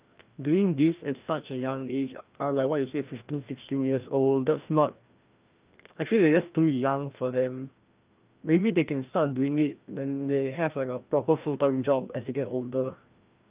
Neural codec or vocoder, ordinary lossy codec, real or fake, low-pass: codec, 16 kHz, 1 kbps, FreqCodec, larger model; Opus, 24 kbps; fake; 3.6 kHz